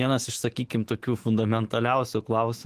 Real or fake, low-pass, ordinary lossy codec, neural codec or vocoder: fake; 14.4 kHz; Opus, 16 kbps; autoencoder, 48 kHz, 128 numbers a frame, DAC-VAE, trained on Japanese speech